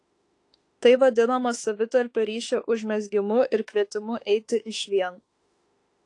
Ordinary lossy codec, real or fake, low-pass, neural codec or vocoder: AAC, 48 kbps; fake; 10.8 kHz; autoencoder, 48 kHz, 32 numbers a frame, DAC-VAE, trained on Japanese speech